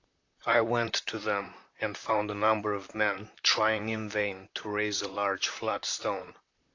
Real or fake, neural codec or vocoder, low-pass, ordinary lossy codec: fake; vocoder, 44.1 kHz, 128 mel bands, Pupu-Vocoder; 7.2 kHz; AAC, 48 kbps